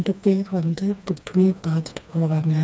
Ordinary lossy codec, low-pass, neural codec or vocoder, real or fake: none; none; codec, 16 kHz, 2 kbps, FreqCodec, smaller model; fake